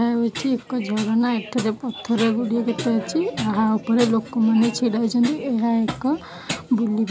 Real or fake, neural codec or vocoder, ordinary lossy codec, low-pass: real; none; none; none